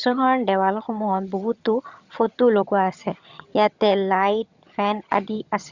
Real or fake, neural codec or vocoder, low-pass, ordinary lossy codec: fake; vocoder, 22.05 kHz, 80 mel bands, HiFi-GAN; 7.2 kHz; Opus, 64 kbps